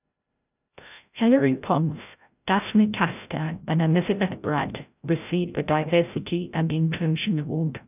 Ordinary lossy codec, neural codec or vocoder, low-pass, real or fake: none; codec, 16 kHz, 0.5 kbps, FreqCodec, larger model; 3.6 kHz; fake